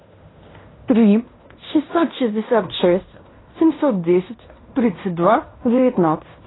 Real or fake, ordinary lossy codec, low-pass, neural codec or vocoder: fake; AAC, 16 kbps; 7.2 kHz; codec, 16 kHz in and 24 kHz out, 0.9 kbps, LongCat-Audio-Codec, fine tuned four codebook decoder